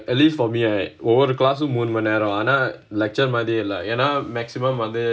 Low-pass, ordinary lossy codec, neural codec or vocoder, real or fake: none; none; none; real